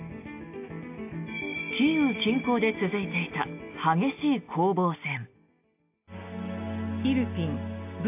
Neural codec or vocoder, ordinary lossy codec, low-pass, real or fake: none; AAC, 32 kbps; 3.6 kHz; real